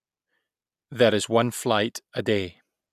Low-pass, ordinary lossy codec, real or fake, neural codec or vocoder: 14.4 kHz; none; real; none